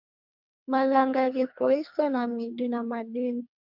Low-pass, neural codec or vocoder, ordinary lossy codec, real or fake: 5.4 kHz; codec, 24 kHz, 3 kbps, HILCodec; MP3, 48 kbps; fake